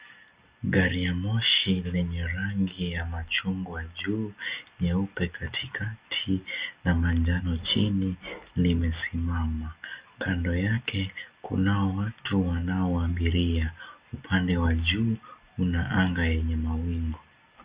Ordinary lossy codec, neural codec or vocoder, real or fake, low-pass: Opus, 64 kbps; none; real; 3.6 kHz